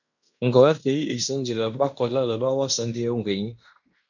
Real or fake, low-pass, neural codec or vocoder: fake; 7.2 kHz; codec, 16 kHz in and 24 kHz out, 0.9 kbps, LongCat-Audio-Codec, fine tuned four codebook decoder